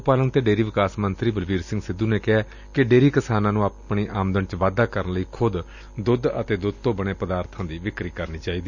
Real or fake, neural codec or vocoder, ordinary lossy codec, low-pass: real; none; none; 7.2 kHz